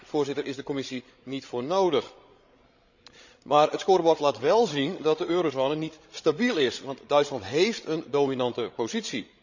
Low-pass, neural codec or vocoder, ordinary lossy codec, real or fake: 7.2 kHz; codec, 16 kHz, 16 kbps, FreqCodec, larger model; Opus, 64 kbps; fake